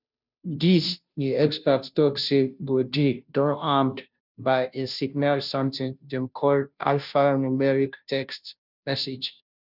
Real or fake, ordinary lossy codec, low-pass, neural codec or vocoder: fake; none; 5.4 kHz; codec, 16 kHz, 0.5 kbps, FunCodec, trained on Chinese and English, 25 frames a second